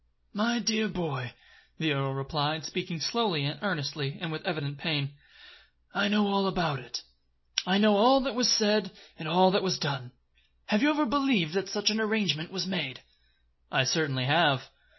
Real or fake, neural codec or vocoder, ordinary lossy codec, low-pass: real; none; MP3, 24 kbps; 7.2 kHz